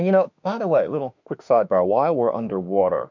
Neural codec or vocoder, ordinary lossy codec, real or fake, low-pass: autoencoder, 48 kHz, 32 numbers a frame, DAC-VAE, trained on Japanese speech; MP3, 64 kbps; fake; 7.2 kHz